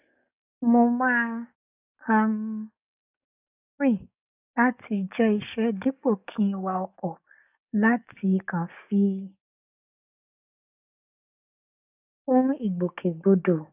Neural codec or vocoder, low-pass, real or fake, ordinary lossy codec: codec, 44.1 kHz, 7.8 kbps, DAC; 3.6 kHz; fake; none